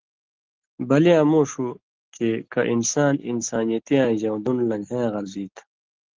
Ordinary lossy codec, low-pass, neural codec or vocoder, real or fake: Opus, 16 kbps; 7.2 kHz; none; real